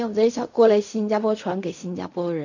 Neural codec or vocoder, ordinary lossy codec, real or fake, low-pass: codec, 16 kHz in and 24 kHz out, 0.4 kbps, LongCat-Audio-Codec, fine tuned four codebook decoder; none; fake; 7.2 kHz